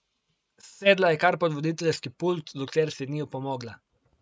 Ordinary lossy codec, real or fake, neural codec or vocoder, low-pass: none; real; none; none